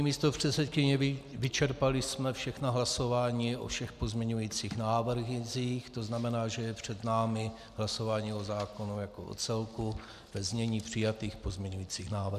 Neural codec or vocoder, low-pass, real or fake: none; 14.4 kHz; real